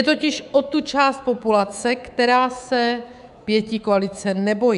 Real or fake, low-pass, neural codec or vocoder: fake; 10.8 kHz; codec, 24 kHz, 3.1 kbps, DualCodec